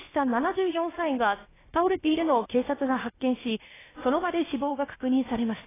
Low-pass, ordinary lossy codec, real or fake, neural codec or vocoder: 3.6 kHz; AAC, 16 kbps; fake; codec, 16 kHz, about 1 kbps, DyCAST, with the encoder's durations